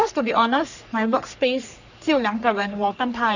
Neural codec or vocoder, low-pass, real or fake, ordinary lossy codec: codec, 44.1 kHz, 3.4 kbps, Pupu-Codec; 7.2 kHz; fake; none